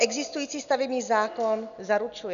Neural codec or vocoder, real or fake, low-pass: none; real; 7.2 kHz